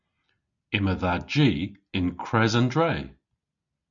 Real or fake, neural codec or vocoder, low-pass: real; none; 7.2 kHz